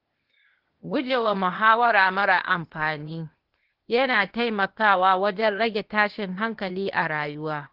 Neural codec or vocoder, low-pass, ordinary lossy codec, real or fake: codec, 16 kHz, 0.8 kbps, ZipCodec; 5.4 kHz; Opus, 16 kbps; fake